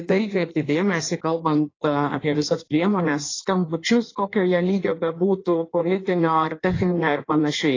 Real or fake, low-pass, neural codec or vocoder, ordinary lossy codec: fake; 7.2 kHz; codec, 16 kHz in and 24 kHz out, 1.1 kbps, FireRedTTS-2 codec; AAC, 32 kbps